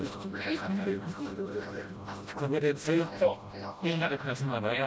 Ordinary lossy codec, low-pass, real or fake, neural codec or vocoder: none; none; fake; codec, 16 kHz, 0.5 kbps, FreqCodec, smaller model